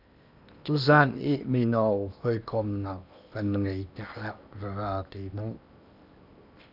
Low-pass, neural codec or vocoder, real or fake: 5.4 kHz; codec, 16 kHz in and 24 kHz out, 0.8 kbps, FocalCodec, streaming, 65536 codes; fake